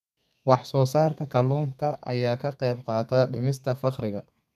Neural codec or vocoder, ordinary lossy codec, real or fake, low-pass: codec, 32 kHz, 1.9 kbps, SNAC; none; fake; 14.4 kHz